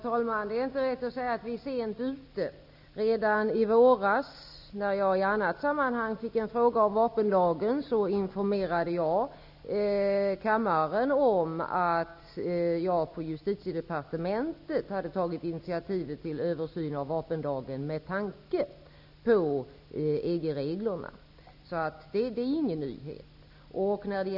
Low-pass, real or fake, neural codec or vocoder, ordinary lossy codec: 5.4 kHz; real; none; none